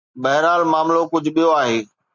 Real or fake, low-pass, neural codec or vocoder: real; 7.2 kHz; none